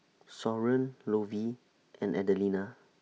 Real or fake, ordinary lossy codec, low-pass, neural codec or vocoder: real; none; none; none